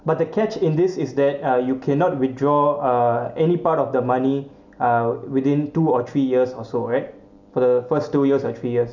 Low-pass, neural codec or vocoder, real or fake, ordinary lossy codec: 7.2 kHz; none; real; none